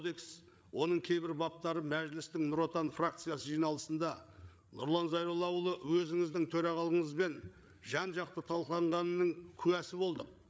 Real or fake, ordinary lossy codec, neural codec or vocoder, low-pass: fake; none; codec, 16 kHz, 8 kbps, FreqCodec, larger model; none